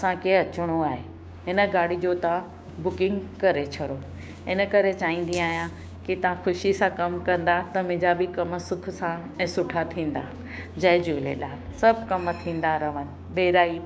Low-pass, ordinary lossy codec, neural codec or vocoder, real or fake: none; none; codec, 16 kHz, 6 kbps, DAC; fake